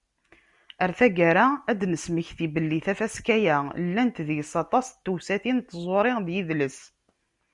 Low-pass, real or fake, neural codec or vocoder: 10.8 kHz; real; none